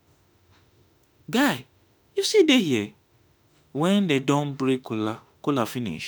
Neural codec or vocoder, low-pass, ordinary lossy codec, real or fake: autoencoder, 48 kHz, 32 numbers a frame, DAC-VAE, trained on Japanese speech; none; none; fake